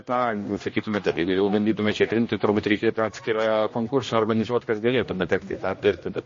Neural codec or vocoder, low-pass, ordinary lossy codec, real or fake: codec, 16 kHz, 1 kbps, X-Codec, HuBERT features, trained on general audio; 7.2 kHz; MP3, 32 kbps; fake